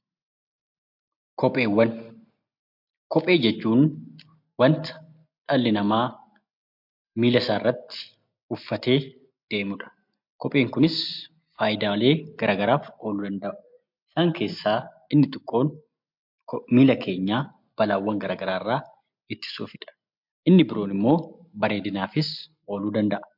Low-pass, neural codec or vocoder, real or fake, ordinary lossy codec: 5.4 kHz; none; real; MP3, 48 kbps